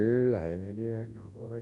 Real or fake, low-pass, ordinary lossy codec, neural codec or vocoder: fake; 10.8 kHz; AAC, 48 kbps; codec, 24 kHz, 0.9 kbps, WavTokenizer, large speech release